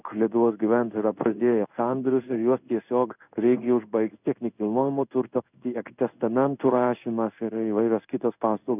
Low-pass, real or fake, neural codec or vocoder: 3.6 kHz; fake; codec, 16 kHz in and 24 kHz out, 1 kbps, XY-Tokenizer